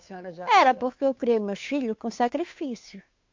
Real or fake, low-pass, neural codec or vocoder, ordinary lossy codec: fake; 7.2 kHz; codec, 16 kHz, 2 kbps, FunCodec, trained on Chinese and English, 25 frames a second; MP3, 48 kbps